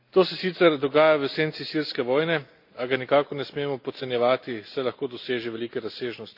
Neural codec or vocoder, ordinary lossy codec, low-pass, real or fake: none; AAC, 48 kbps; 5.4 kHz; real